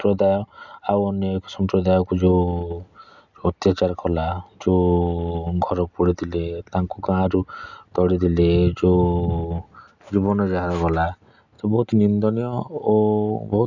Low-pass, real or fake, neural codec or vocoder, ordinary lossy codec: 7.2 kHz; real; none; none